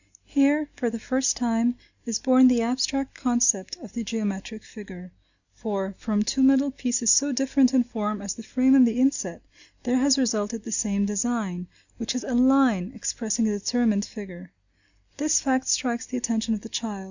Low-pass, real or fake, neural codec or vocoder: 7.2 kHz; real; none